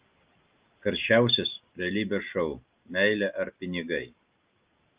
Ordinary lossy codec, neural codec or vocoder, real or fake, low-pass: Opus, 32 kbps; none; real; 3.6 kHz